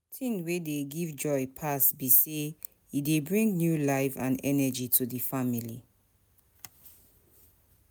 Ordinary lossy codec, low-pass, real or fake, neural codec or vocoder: none; none; real; none